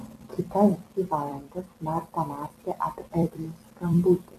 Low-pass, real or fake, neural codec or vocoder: 14.4 kHz; fake; vocoder, 44.1 kHz, 128 mel bands every 256 samples, BigVGAN v2